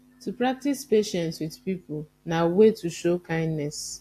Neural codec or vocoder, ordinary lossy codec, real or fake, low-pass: none; AAC, 64 kbps; real; 14.4 kHz